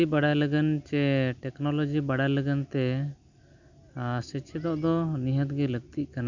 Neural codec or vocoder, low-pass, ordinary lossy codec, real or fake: none; 7.2 kHz; none; real